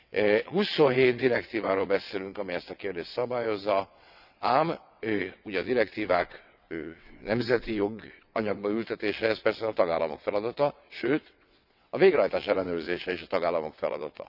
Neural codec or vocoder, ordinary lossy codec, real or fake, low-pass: vocoder, 22.05 kHz, 80 mel bands, WaveNeXt; none; fake; 5.4 kHz